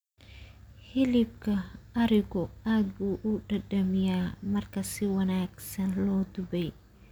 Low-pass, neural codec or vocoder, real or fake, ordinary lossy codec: none; none; real; none